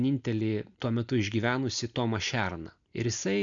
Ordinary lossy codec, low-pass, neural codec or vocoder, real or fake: AAC, 64 kbps; 7.2 kHz; none; real